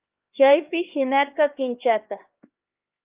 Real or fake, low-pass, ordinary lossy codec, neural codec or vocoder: fake; 3.6 kHz; Opus, 32 kbps; codec, 16 kHz, 2 kbps, X-Codec, HuBERT features, trained on LibriSpeech